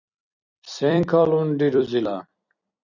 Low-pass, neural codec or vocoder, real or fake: 7.2 kHz; vocoder, 44.1 kHz, 128 mel bands every 256 samples, BigVGAN v2; fake